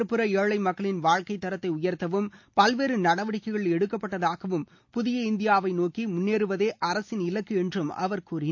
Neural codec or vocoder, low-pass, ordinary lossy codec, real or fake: none; 7.2 kHz; MP3, 48 kbps; real